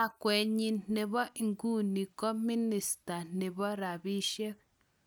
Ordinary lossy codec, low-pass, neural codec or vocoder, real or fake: none; none; none; real